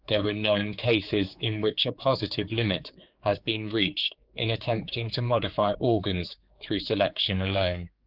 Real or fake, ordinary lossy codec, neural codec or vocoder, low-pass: fake; Opus, 24 kbps; codec, 16 kHz, 4 kbps, X-Codec, HuBERT features, trained on general audio; 5.4 kHz